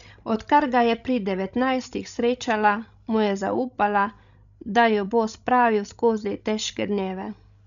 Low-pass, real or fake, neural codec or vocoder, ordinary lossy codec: 7.2 kHz; fake; codec, 16 kHz, 16 kbps, FreqCodec, larger model; none